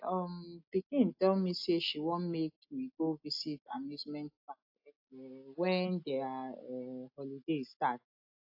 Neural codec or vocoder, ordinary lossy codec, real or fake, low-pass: none; AAC, 48 kbps; real; 5.4 kHz